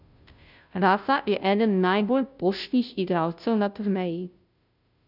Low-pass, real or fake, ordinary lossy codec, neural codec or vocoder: 5.4 kHz; fake; none; codec, 16 kHz, 0.5 kbps, FunCodec, trained on Chinese and English, 25 frames a second